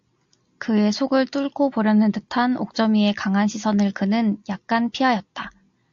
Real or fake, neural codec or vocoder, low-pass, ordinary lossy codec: real; none; 7.2 kHz; AAC, 64 kbps